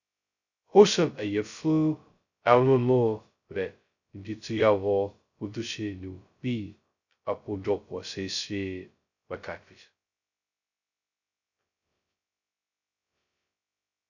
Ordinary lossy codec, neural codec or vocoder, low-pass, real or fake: none; codec, 16 kHz, 0.2 kbps, FocalCodec; 7.2 kHz; fake